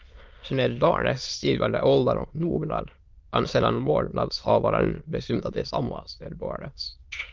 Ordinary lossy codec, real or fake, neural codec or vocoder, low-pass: Opus, 32 kbps; fake; autoencoder, 22.05 kHz, a latent of 192 numbers a frame, VITS, trained on many speakers; 7.2 kHz